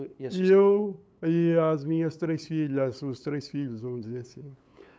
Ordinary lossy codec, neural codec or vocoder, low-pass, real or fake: none; codec, 16 kHz, 8 kbps, FunCodec, trained on LibriTTS, 25 frames a second; none; fake